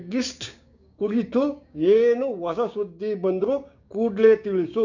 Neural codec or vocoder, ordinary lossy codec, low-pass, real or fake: codec, 16 kHz, 6 kbps, DAC; none; 7.2 kHz; fake